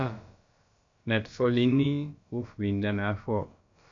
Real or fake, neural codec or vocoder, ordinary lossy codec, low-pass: fake; codec, 16 kHz, about 1 kbps, DyCAST, with the encoder's durations; MP3, 64 kbps; 7.2 kHz